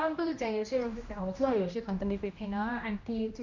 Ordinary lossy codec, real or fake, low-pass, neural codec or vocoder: Opus, 64 kbps; fake; 7.2 kHz; codec, 16 kHz, 1 kbps, X-Codec, HuBERT features, trained on general audio